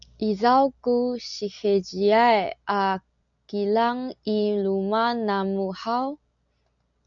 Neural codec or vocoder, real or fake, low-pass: none; real; 7.2 kHz